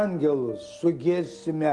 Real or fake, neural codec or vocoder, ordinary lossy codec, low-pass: real; none; Opus, 24 kbps; 10.8 kHz